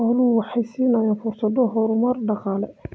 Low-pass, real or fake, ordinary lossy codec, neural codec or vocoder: none; real; none; none